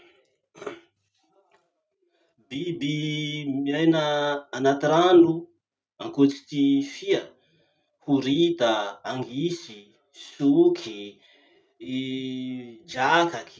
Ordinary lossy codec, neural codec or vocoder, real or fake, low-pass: none; none; real; none